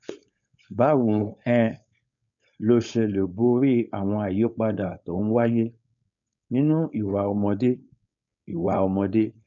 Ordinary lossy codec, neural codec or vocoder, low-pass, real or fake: none; codec, 16 kHz, 4.8 kbps, FACodec; 7.2 kHz; fake